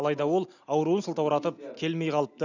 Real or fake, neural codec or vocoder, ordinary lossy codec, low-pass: real; none; none; 7.2 kHz